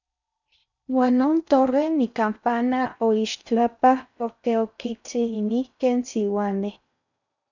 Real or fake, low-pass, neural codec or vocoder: fake; 7.2 kHz; codec, 16 kHz in and 24 kHz out, 0.6 kbps, FocalCodec, streaming, 4096 codes